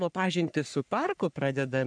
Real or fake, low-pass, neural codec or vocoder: fake; 9.9 kHz; codec, 44.1 kHz, 3.4 kbps, Pupu-Codec